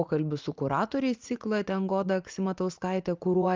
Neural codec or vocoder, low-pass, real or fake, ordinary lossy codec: vocoder, 44.1 kHz, 80 mel bands, Vocos; 7.2 kHz; fake; Opus, 24 kbps